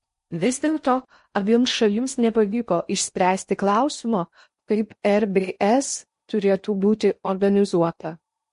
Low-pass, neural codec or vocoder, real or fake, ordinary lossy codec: 10.8 kHz; codec, 16 kHz in and 24 kHz out, 0.6 kbps, FocalCodec, streaming, 4096 codes; fake; MP3, 48 kbps